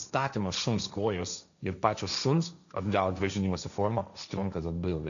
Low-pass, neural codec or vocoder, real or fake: 7.2 kHz; codec, 16 kHz, 1.1 kbps, Voila-Tokenizer; fake